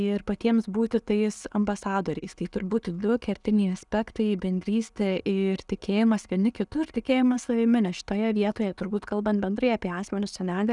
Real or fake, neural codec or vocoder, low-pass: real; none; 10.8 kHz